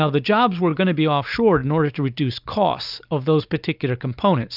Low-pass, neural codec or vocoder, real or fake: 5.4 kHz; none; real